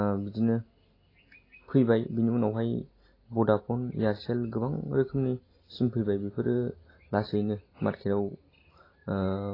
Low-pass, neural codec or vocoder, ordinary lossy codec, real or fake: 5.4 kHz; none; AAC, 24 kbps; real